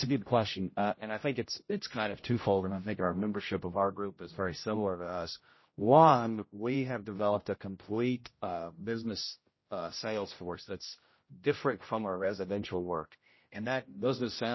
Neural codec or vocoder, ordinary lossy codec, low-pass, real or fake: codec, 16 kHz, 0.5 kbps, X-Codec, HuBERT features, trained on general audio; MP3, 24 kbps; 7.2 kHz; fake